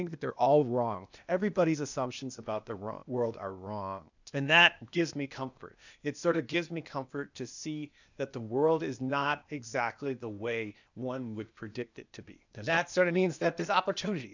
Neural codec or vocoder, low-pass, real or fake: codec, 16 kHz, 0.8 kbps, ZipCodec; 7.2 kHz; fake